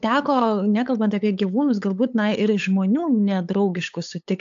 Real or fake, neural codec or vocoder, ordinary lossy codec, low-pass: fake; codec, 16 kHz, 8 kbps, FunCodec, trained on LibriTTS, 25 frames a second; AAC, 64 kbps; 7.2 kHz